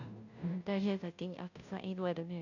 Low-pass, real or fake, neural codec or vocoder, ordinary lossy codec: 7.2 kHz; fake; codec, 16 kHz, 0.5 kbps, FunCodec, trained on Chinese and English, 25 frames a second; none